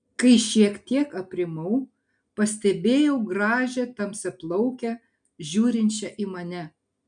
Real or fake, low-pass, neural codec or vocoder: real; 9.9 kHz; none